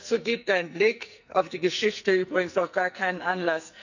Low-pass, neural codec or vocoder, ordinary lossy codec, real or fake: 7.2 kHz; codec, 44.1 kHz, 2.6 kbps, SNAC; none; fake